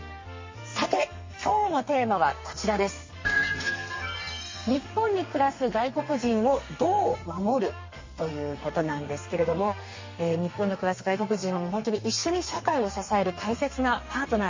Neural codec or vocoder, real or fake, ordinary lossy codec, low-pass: codec, 32 kHz, 1.9 kbps, SNAC; fake; MP3, 32 kbps; 7.2 kHz